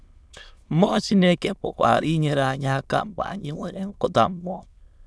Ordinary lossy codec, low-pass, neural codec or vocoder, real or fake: none; none; autoencoder, 22.05 kHz, a latent of 192 numbers a frame, VITS, trained on many speakers; fake